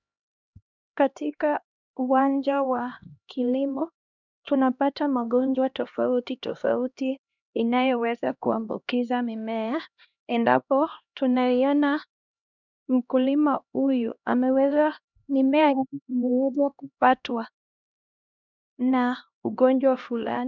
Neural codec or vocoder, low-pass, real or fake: codec, 16 kHz, 1 kbps, X-Codec, HuBERT features, trained on LibriSpeech; 7.2 kHz; fake